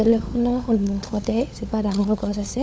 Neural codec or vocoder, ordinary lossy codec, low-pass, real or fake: codec, 16 kHz, 16 kbps, FunCodec, trained on LibriTTS, 50 frames a second; none; none; fake